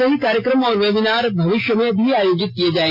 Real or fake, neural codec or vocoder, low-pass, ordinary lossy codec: real; none; 5.4 kHz; none